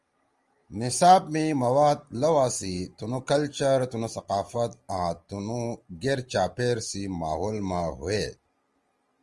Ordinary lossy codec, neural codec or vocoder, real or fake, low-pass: Opus, 24 kbps; none; real; 10.8 kHz